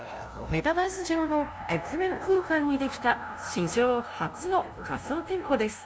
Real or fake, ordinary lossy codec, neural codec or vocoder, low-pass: fake; none; codec, 16 kHz, 0.5 kbps, FunCodec, trained on LibriTTS, 25 frames a second; none